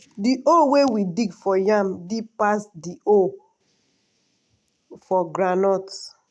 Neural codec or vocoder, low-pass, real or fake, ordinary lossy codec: none; none; real; none